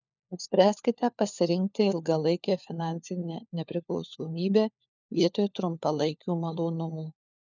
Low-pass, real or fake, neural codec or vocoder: 7.2 kHz; fake; codec, 16 kHz, 4 kbps, FunCodec, trained on LibriTTS, 50 frames a second